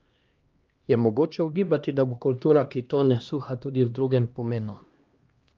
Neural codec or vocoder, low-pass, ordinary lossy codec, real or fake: codec, 16 kHz, 1 kbps, X-Codec, HuBERT features, trained on LibriSpeech; 7.2 kHz; Opus, 32 kbps; fake